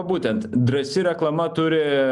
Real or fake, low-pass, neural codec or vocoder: real; 10.8 kHz; none